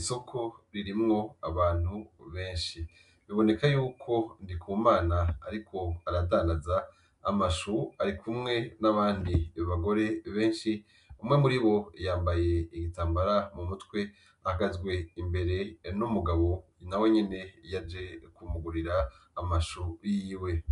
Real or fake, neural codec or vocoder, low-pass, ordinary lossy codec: real; none; 10.8 kHz; MP3, 96 kbps